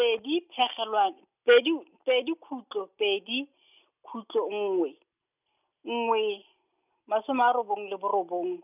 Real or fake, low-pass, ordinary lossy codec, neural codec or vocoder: real; 3.6 kHz; none; none